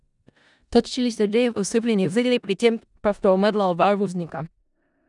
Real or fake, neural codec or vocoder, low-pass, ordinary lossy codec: fake; codec, 16 kHz in and 24 kHz out, 0.4 kbps, LongCat-Audio-Codec, four codebook decoder; 10.8 kHz; none